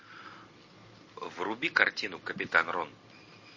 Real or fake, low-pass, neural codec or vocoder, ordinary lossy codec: real; 7.2 kHz; none; MP3, 32 kbps